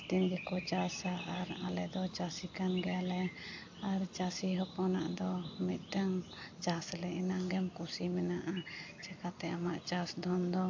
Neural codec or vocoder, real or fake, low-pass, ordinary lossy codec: none; real; 7.2 kHz; AAC, 48 kbps